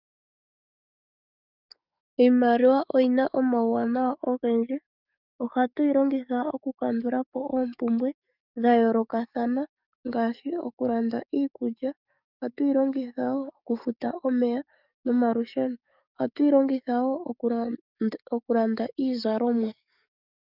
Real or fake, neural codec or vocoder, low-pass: fake; codec, 44.1 kHz, 7.8 kbps, DAC; 5.4 kHz